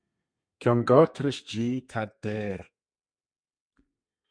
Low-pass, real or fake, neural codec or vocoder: 9.9 kHz; fake; codec, 32 kHz, 1.9 kbps, SNAC